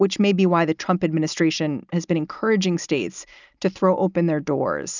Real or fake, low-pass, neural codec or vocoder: real; 7.2 kHz; none